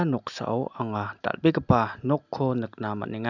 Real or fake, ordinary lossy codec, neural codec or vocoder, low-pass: real; none; none; 7.2 kHz